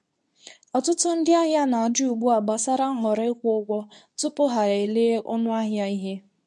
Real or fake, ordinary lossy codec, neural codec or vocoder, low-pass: fake; none; codec, 24 kHz, 0.9 kbps, WavTokenizer, medium speech release version 2; none